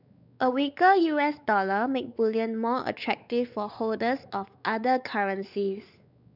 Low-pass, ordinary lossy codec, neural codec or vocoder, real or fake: 5.4 kHz; none; codec, 16 kHz, 4 kbps, X-Codec, WavLM features, trained on Multilingual LibriSpeech; fake